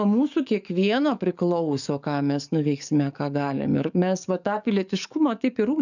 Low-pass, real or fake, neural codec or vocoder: 7.2 kHz; fake; codec, 44.1 kHz, 7.8 kbps, DAC